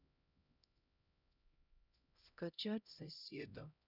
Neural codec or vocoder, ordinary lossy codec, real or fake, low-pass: codec, 16 kHz, 0.5 kbps, X-Codec, HuBERT features, trained on LibriSpeech; none; fake; 5.4 kHz